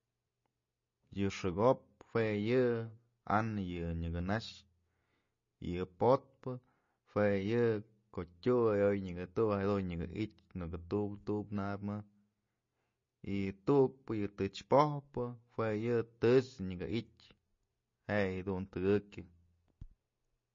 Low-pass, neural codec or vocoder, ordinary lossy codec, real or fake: 7.2 kHz; none; MP3, 32 kbps; real